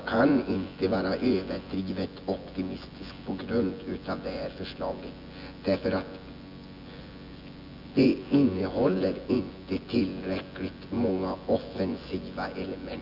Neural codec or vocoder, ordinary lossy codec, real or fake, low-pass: vocoder, 24 kHz, 100 mel bands, Vocos; none; fake; 5.4 kHz